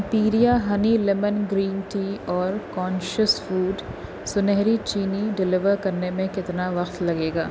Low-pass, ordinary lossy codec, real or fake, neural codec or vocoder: none; none; real; none